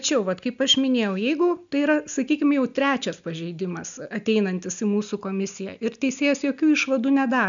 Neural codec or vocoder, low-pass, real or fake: none; 7.2 kHz; real